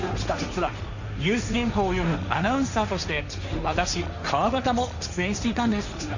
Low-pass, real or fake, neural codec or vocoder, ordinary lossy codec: 7.2 kHz; fake; codec, 16 kHz, 1.1 kbps, Voila-Tokenizer; none